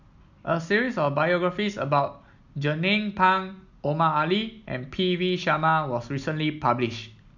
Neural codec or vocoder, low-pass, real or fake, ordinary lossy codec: none; 7.2 kHz; real; none